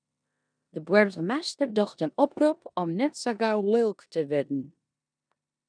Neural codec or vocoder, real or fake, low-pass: codec, 16 kHz in and 24 kHz out, 0.9 kbps, LongCat-Audio-Codec, four codebook decoder; fake; 9.9 kHz